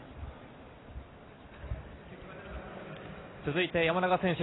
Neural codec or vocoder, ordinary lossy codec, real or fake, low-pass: none; AAC, 16 kbps; real; 7.2 kHz